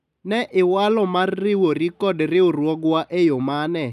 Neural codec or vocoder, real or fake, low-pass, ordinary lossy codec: none; real; 14.4 kHz; none